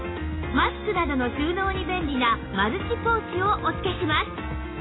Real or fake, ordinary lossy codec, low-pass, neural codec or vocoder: real; AAC, 16 kbps; 7.2 kHz; none